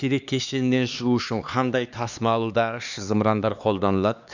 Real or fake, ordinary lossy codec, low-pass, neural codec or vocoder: fake; none; 7.2 kHz; codec, 16 kHz, 2 kbps, X-Codec, HuBERT features, trained on LibriSpeech